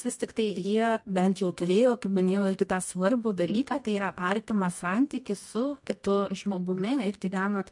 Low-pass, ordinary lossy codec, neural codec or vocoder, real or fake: 10.8 kHz; MP3, 64 kbps; codec, 24 kHz, 0.9 kbps, WavTokenizer, medium music audio release; fake